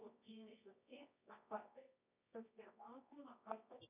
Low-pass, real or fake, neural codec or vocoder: 3.6 kHz; fake; codec, 24 kHz, 0.9 kbps, WavTokenizer, medium music audio release